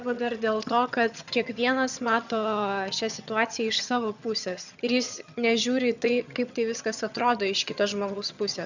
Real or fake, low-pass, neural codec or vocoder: fake; 7.2 kHz; vocoder, 22.05 kHz, 80 mel bands, HiFi-GAN